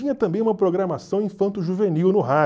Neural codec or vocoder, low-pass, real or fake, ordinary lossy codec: none; none; real; none